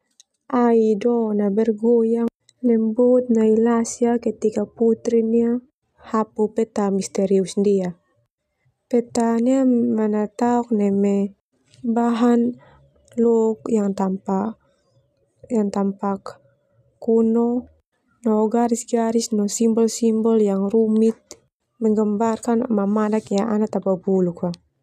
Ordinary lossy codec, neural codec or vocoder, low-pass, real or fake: none; none; 14.4 kHz; real